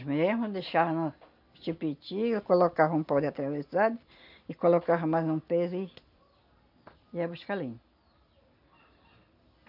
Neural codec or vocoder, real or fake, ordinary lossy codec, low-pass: none; real; none; 5.4 kHz